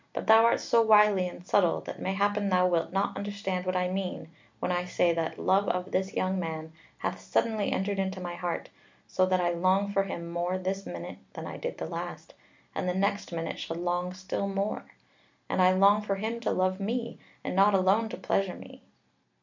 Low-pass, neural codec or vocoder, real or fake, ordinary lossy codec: 7.2 kHz; none; real; MP3, 64 kbps